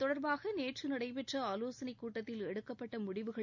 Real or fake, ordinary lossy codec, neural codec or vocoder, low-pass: real; none; none; 7.2 kHz